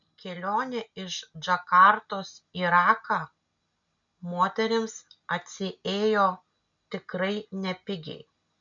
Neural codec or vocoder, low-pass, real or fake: none; 7.2 kHz; real